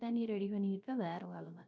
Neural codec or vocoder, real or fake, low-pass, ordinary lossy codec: codec, 16 kHz, 0.3 kbps, FocalCodec; fake; none; none